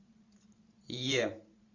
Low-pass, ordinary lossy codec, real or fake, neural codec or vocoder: 7.2 kHz; Opus, 64 kbps; fake; vocoder, 44.1 kHz, 128 mel bands every 512 samples, BigVGAN v2